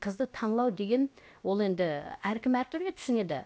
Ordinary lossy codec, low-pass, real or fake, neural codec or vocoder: none; none; fake; codec, 16 kHz, about 1 kbps, DyCAST, with the encoder's durations